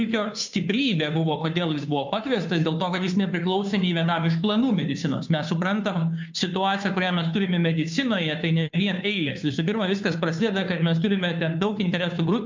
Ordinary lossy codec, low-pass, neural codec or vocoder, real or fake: MP3, 64 kbps; 7.2 kHz; codec, 16 kHz, 2 kbps, FunCodec, trained on Chinese and English, 25 frames a second; fake